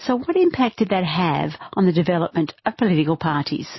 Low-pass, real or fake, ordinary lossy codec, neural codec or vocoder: 7.2 kHz; real; MP3, 24 kbps; none